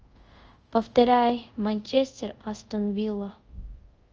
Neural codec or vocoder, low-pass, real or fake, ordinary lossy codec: codec, 24 kHz, 0.5 kbps, DualCodec; 7.2 kHz; fake; Opus, 24 kbps